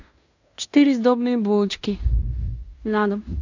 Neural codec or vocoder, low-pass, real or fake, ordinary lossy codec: codec, 16 kHz in and 24 kHz out, 0.9 kbps, LongCat-Audio-Codec, fine tuned four codebook decoder; 7.2 kHz; fake; AAC, 48 kbps